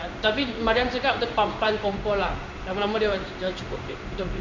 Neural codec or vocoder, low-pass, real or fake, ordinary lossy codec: codec, 16 kHz in and 24 kHz out, 1 kbps, XY-Tokenizer; 7.2 kHz; fake; MP3, 64 kbps